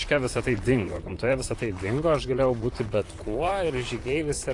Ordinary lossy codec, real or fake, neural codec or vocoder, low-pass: AAC, 48 kbps; fake; vocoder, 44.1 kHz, 128 mel bands, Pupu-Vocoder; 10.8 kHz